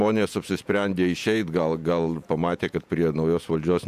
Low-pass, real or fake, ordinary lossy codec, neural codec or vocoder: 14.4 kHz; fake; MP3, 96 kbps; vocoder, 48 kHz, 128 mel bands, Vocos